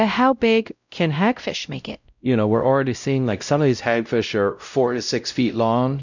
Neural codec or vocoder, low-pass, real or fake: codec, 16 kHz, 0.5 kbps, X-Codec, WavLM features, trained on Multilingual LibriSpeech; 7.2 kHz; fake